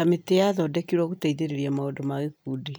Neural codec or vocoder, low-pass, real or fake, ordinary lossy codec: none; none; real; none